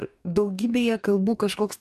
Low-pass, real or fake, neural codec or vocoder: 14.4 kHz; fake; codec, 44.1 kHz, 2.6 kbps, DAC